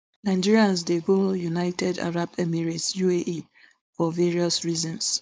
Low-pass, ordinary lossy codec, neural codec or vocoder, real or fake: none; none; codec, 16 kHz, 4.8 kbps, FACodec; fake